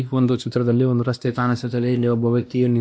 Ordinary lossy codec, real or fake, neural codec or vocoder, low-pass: none; fake; codec, 16 kHz, 1 kbps, X-Codec, WavLM features, trained on Multilingual LibriSpeech; none